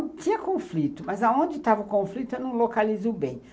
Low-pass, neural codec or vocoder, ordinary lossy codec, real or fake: none; none; none; real